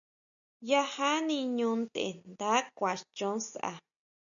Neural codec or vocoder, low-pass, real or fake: none; 7.2 kHz; real